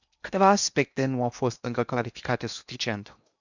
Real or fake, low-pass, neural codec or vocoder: fake; 7.2 kHz; codec, 16 kHz in and 24 kHz out, 0.8 kbps, FocalCodec, streaming, 65536 codes